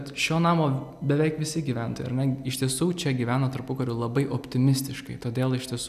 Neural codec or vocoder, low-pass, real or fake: none; 14.4 kHz; real